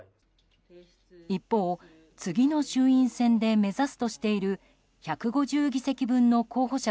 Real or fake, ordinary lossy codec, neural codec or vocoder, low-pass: real; none; none; none